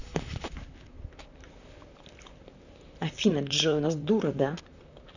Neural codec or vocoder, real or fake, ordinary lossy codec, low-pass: vocoder, 44.1 kHz, 128 mel bands, Pupu-Vocoder; fake; none; 7.2 kHz